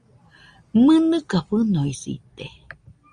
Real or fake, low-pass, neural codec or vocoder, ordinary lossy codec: real; 9.9 kHz; none; Opus, 32 kbps